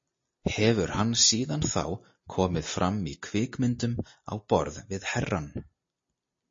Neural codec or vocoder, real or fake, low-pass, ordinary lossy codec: none; real; 7.2 kHz; MP3, 32 kbps